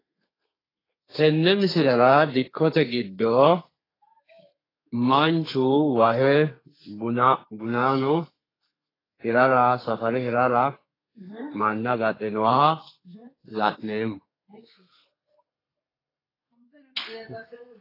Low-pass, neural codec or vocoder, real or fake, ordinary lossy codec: 5.4 kHz; codec, 32 kHz, 1.9 kbps, SNAC; fake; AAC, 24 kbps